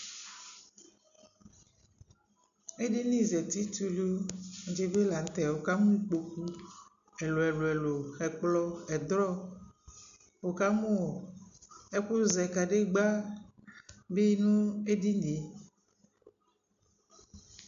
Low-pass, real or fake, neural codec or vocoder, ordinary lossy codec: 7.2 kHz; real; none; AAC, 96 kbps